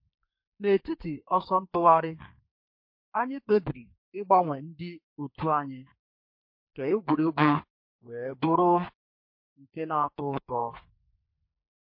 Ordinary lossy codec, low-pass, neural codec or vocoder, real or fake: MP3, 32 kbps; 5.4 kHz; codec, 32 kHz, 1.9 kbps, SNAC; fake